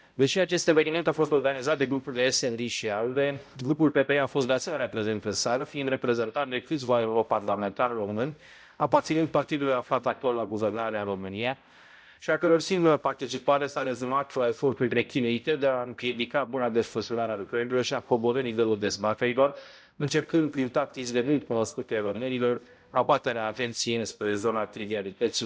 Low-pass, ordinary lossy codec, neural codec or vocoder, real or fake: none; none; codec, 16 kHz, 0.5 kbps, X-Codec, HuBERT features, trained on balanced general audio; fake